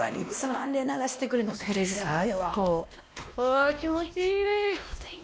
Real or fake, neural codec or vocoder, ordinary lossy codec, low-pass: fake; codec, 16 kHz, 1 kbps, X-Codec, WavLM features, trained on Multilingual LibriSpeech; none; none